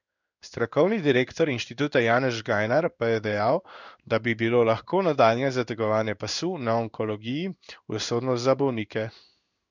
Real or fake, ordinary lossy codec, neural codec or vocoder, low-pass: fake; none; codec, 16 kHz in and 24 kHz out, 1 kbps, XY-Tokenizer; 7.2 kHz